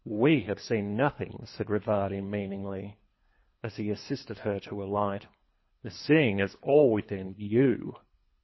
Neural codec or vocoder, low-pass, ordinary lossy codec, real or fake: codec, 24 kHz, 3 kbps, HILCodec; 7.2 kHz; MP3, 24 kbps; fake